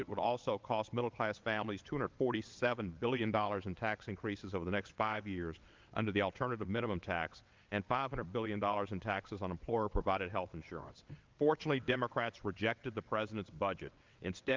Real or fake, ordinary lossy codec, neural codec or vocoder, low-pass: fake; Opus, 32 kbps; vocoder, 22.05 kHz, 80 mel bands, WaveNeXt; 7.2 kHz